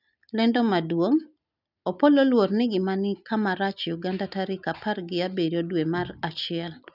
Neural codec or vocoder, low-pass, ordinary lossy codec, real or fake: none; 5.4 kHz; none; real